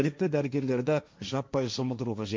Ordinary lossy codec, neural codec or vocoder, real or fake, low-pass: none; codec, 16 kHz, 1.1 kbps, Voila-Tokenizer; fake; none